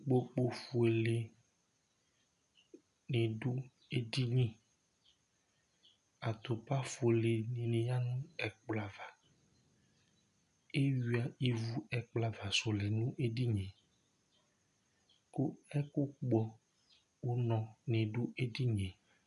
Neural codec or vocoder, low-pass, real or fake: none; 10.8 kHz; real